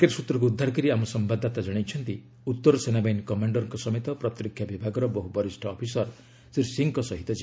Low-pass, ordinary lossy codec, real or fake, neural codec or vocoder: none; none; real; none